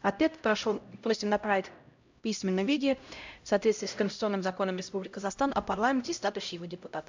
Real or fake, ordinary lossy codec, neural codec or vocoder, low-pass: fake; MP3, 64 kbps; codec, 16 kHz, 0.5 kbps, X-Codec, HuBERT features, trained on LibriSpeech; 7.2 kHz